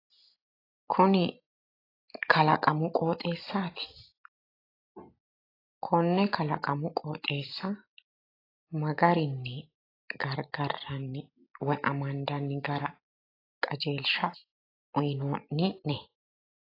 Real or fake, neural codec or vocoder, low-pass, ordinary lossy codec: real; none; 5.4 kHz; AAC, 24 kbps